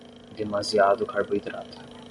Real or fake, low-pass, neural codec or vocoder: real; 10.8 kHz; none